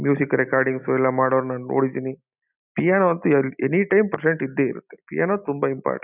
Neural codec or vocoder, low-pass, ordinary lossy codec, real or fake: none; 3.6 kHz; none; real